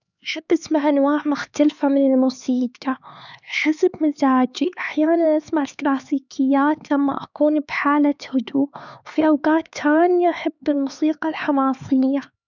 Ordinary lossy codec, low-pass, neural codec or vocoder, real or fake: none; 7.2 kHz; codec, 16 kHz, 4 kbps, X-Codec, HuBERT features, trained on LibriSpeech; fake